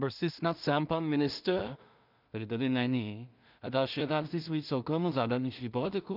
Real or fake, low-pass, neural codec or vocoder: fake; 5.4 kHz; codec, 16 kHz in and 24 kHz out, 0.4 kbps, LongCat-Audio-Codec, two codebook decoder